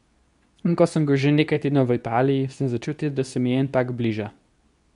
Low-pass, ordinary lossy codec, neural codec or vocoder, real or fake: 10.8 kHz; none; codec, 24 kHz, 0.9 kbps, WavTokenizer, medium speech release version 2; fake